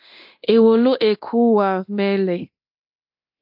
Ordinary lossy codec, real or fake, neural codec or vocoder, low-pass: AAC, 48 kbps; fake; codec, 24 kHz, 0.9 kbps, DualCodec; 5.4 kHz